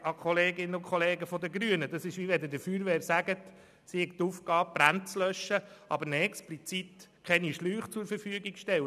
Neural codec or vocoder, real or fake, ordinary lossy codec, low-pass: none; real; none; 14.4 kHz